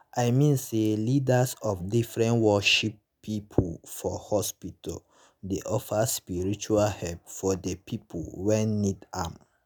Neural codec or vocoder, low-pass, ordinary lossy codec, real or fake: none; none; none; real